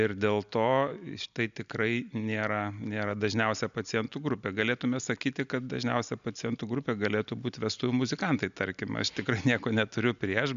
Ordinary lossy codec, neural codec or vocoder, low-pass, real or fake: AAC, 96 kbps; none; 7.2 kHz; real